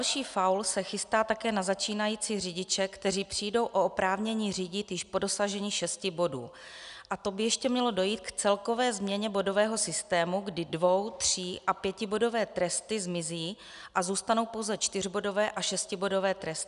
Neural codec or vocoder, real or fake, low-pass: none; real; 10.8 kHz